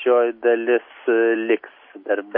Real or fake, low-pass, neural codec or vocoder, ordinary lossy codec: real; 5.4 kHz; none; MP3, 32 kbps